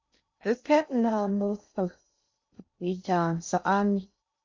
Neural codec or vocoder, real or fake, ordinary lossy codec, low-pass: codec, 16 kHz in and 24 kHz out, 0.6 kbps, FocalCodec, streaming, 2048 codes; fake; MP3, 64 kbps; 7.2 kHz